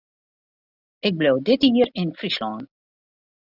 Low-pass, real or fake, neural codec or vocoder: 5.4 kHz; real; none